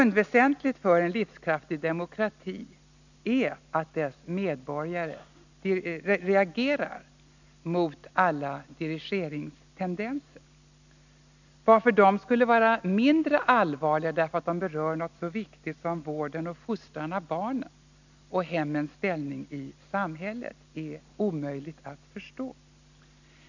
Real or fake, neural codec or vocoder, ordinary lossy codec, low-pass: real; none; none; 7.2 kHz